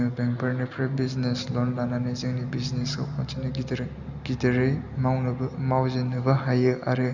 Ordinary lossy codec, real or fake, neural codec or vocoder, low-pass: none; real; none; 7.2 kHz